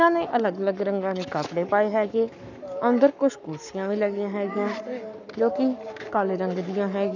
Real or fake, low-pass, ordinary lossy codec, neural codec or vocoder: fake; 7.2 kHz; none; codec, 44.1 kHz, 7.8 kbps, Pupu-Codec